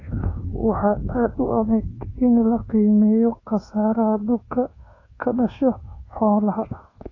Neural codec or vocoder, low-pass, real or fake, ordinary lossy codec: codec, 24 kHz, 1.2 kbps, DualCodec; 7.2 kHz; fake; AAC, 32 kbps